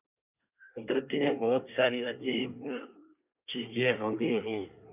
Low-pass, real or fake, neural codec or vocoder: 3.6 kHz; fake; codec, 24 kHz, 1 kbps, SNAC